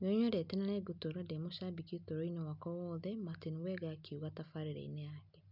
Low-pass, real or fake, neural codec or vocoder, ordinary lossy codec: 5.4 kHz; real; none; none